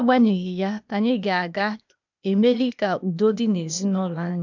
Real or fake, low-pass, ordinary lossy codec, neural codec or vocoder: fake; 7.2 kHz; none; codec, 16 kHz, 0.8 kbps, ZipCodec